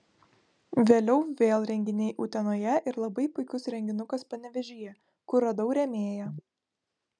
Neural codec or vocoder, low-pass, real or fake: none; 9.9 kHz; real